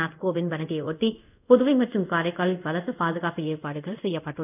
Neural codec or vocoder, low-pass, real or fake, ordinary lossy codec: codec, 24 kHz, 0.5 kbps, DualCodec; 3.6 kHz; fake; none